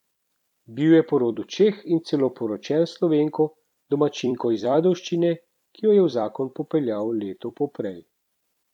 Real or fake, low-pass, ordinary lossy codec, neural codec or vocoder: fake; 19.8 kHz; none; vocoder, 44.1 kHz, 128 mel bands every 256 samples, BigVGAN v2